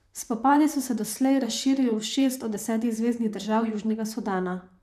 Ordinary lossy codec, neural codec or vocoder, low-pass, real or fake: none; vocoder, 44.1 kHz, 128 mel bands, Pupu-Vocoder; 14.4 kHz; fake